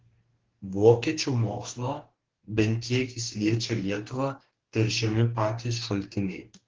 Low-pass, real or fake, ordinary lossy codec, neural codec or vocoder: 7.2 kHz; fake; Opus, 16 kbps; codec, 44.1 kHz, 2.6 kbps, DAC